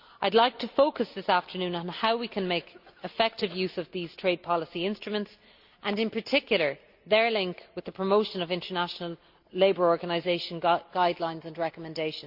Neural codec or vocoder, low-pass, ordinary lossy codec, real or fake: none; 5.4 kHz; Opus, 64 kbps; real